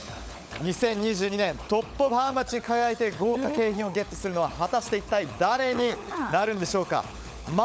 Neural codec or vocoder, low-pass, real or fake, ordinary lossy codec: codec, 16 kHz, 16 kbps, FunCodec, trained on LibriTTS, 50 frames a second; none; fake; none